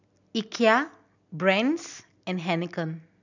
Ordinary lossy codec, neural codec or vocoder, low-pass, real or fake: none; none; 7.2 kHz; real